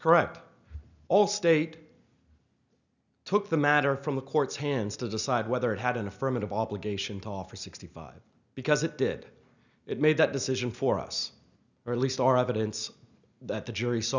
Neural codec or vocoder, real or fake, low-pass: none; real; 7.2 kHz